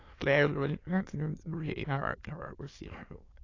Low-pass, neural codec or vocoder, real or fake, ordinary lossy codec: 7.2 kHz; autoencoder, 22.05 kHz, a latent of 192 numbers a frame, VITS, trained on many speakers; fake; AAC, 32 kbps